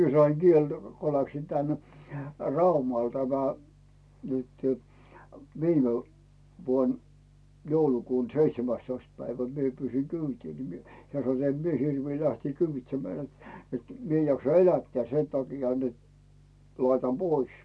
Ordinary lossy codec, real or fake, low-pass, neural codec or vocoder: none; real; none; none